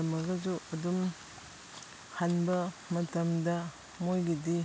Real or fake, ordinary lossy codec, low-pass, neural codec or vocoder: real; none; none; none